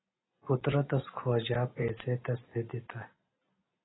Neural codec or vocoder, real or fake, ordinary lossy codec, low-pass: none; real; AAC, 16 kbps; 7.2 kHz